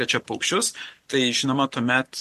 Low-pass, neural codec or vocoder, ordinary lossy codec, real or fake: 14.4 kHz; none; MP3, 64 kbps; real